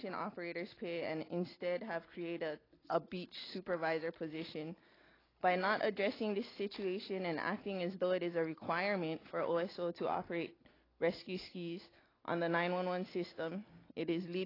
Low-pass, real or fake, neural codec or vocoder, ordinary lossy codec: 5.4 kHz; real; none; AAC, 24 kbps